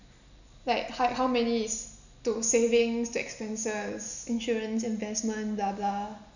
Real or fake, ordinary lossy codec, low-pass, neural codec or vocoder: real; none; 7.2 kHz; none